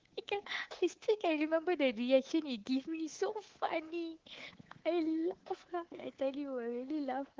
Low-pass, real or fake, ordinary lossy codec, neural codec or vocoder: 7.2 kHz; fake; Opus, 16 kbps; codec, 24 kHz, 3.1 kbps, DualCodec